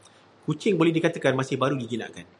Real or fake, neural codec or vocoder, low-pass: real; none; 10.8 kHz